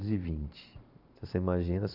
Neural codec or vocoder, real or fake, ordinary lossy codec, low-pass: vocoder, 44.1 kHz, 128 mel bands every 512 samples, BigVGAN v2; fake; none; 5.4 kHz